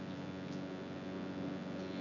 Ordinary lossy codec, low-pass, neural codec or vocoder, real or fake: none; 7.2 kHz; vocoder, 24 kHz, 100 mel bands, Vocos; fake